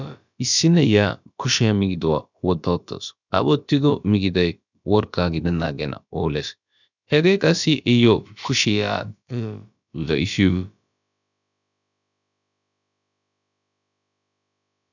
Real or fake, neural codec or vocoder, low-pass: fake; codec, 16 kHz, about 1 kbps, DyCAST, with the encoder's durations; 7.2 kHz